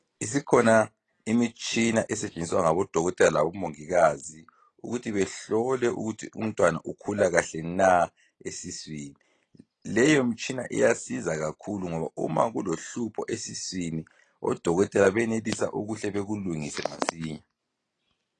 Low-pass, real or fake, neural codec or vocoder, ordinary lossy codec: 9.9 kHz; real; none; AAC, 32 kbps